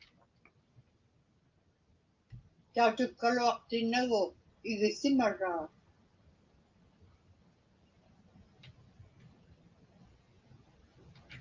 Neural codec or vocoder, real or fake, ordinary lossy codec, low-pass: none; real; Opus, 32 kbps; 7.2 kHz